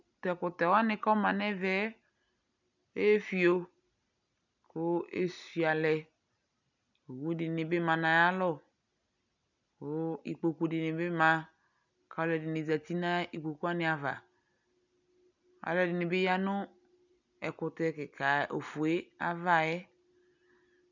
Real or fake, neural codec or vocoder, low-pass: real; none; 7.2 kHz